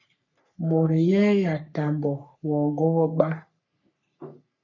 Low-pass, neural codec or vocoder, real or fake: 7.2 kHz; codec, 44.1 kHz, 3.4 kbps, Pupu-Codec; fake